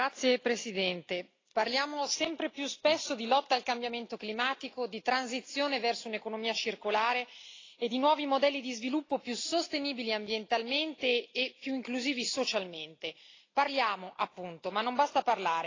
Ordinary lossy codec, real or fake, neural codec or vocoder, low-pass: AAC, 32 kbps; real; none; 7.2 kHz